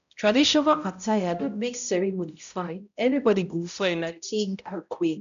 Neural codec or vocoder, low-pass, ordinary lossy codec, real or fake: codec, 16 kHz, 0.5 kbps, X-Codec, HuBERT features, trained on balanced general audio; 7.2 kHz; none; fake